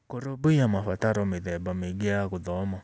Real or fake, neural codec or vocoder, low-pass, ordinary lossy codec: real; none; none; none